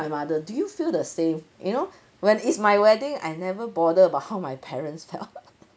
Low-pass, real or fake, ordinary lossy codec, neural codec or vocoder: none; real; none; none